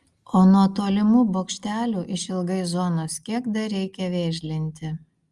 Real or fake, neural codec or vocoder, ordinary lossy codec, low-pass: real; none; Opus, 32 kbps; 10.8 kHz